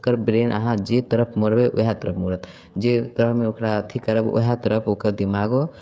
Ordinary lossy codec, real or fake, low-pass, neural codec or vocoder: none; fake; none; codec, 16 kHz, 16 kbps, FreqCodec, smaller model